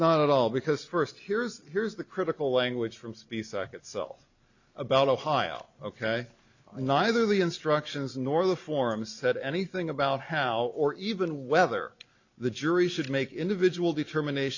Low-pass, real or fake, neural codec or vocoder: 7.2 kHz; real; none